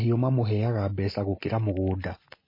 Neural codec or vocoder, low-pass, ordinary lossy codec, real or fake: none; 5.4 kHz; MP3, 24 kbps; real